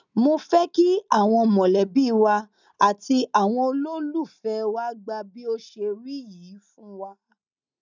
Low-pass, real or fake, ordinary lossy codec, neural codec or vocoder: 7.2 kHz; real; none; none